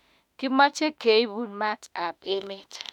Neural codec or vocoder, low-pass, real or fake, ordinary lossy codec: autoencoder, 48 kHz, 32 numbers a frame, DAC-VAE, trained on Japanese speech; 19.8 kHz; fake; none